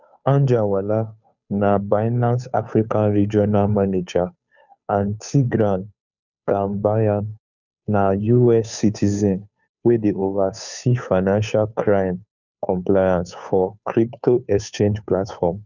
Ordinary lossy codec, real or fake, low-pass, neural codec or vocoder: none; fake; 7.2 kHz; codec, 16 kHz, 2 kbps, FunCodec, trained on Chinese and English, 25 frames a second